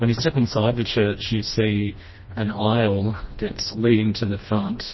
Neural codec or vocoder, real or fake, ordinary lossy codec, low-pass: codec, 16 kHz, 1 kbps, FreqCodec, smaller model; fake; MP3, 24 kbps; 7.2 kHz